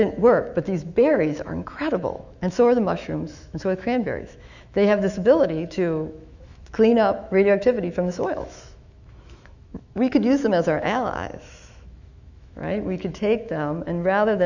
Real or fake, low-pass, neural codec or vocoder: fake; 7.2 kHz; autoencoder, 48 kHz, 128 numbers a frame, DAC-VAE, trained on Japanese speech